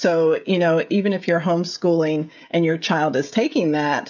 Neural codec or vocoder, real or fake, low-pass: codec, 16 kHz, 16 kbps, FreqCodec, smaller model; fake; 7.2 kHz